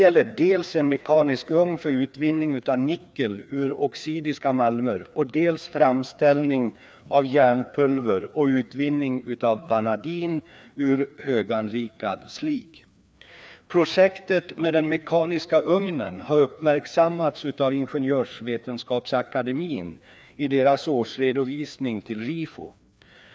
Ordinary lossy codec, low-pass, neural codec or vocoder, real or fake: none; none; codec, 16 kHz, 2 kbps, FreqCodec, larger model; fake